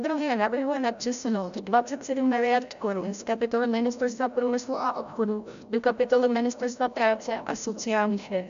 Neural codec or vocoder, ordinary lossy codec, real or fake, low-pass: codec, 16 kHz, 0.5 kbps, FreqCodec, larger model; AAC, 96 kbps; fake; 7.2 kHz